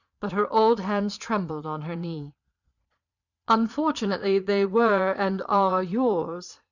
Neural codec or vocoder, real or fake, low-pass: vocoder, 22.05 kHz, 80 mel bands, Vocos; fake; 7.2 kHz